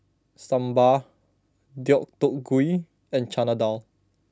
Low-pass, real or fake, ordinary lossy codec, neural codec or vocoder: none; real; none; none